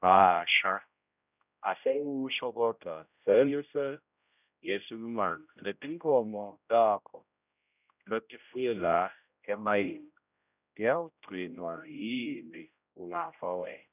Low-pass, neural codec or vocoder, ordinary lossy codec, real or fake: 3.6 kHz; codec, 16 kHz, 0.5 kbps, X-Codec, HuBERT features, trained on general audio; none; fake